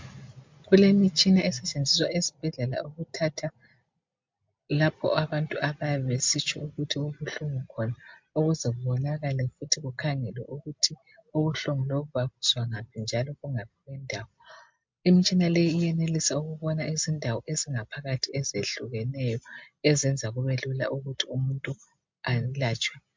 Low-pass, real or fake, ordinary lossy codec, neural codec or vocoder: 7.2 kHz; real; MP3, 64 kbps; none